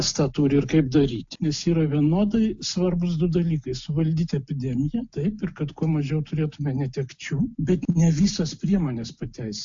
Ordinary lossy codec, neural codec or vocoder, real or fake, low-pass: AAC, 64 kbps; none; real; 7.2 kHz